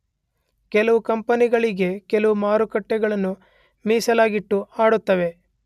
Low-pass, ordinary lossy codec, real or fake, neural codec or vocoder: 14.4 kHz; none; real; none